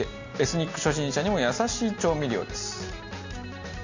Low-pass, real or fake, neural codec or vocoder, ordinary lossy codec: 7.2 kHz; real; none; Opus, 64 kbps